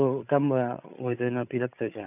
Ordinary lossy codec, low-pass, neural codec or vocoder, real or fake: none; 3.6 kHz; codec, 16 kHz, 8 kbps, FreqCodec, larger model; fake